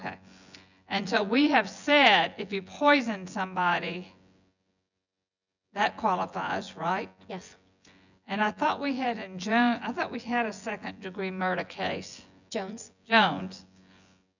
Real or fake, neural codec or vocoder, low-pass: fake; vocoder, 24 kHz, 100 mel bands, Vocos; 7.2 kHz